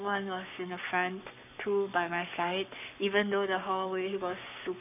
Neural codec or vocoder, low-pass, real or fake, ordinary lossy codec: codec, 44.1 kHz, 7.8 kbps, Pupu-Codec; 3.6 kHz; fake; none